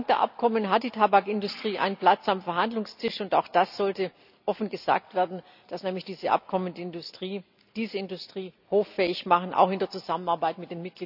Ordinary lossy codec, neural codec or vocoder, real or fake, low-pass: none; none; real; 5.4 kHz